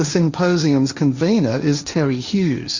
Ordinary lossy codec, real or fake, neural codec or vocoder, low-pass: Opus, 64 kbps; fake; codec, 16 kHz, 1.1 kbps, Voila-Tokenizer; 7.2 kHz